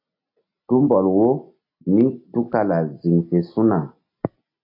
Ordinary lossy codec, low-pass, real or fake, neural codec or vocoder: AAC, 32 kbps; 5.4 kHz; real; none